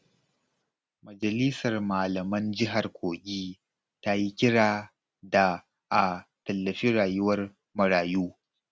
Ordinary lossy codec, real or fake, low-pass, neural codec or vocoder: none; real; none; none